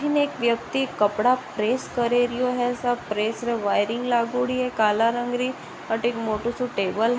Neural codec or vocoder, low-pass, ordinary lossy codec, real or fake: none; none; none; real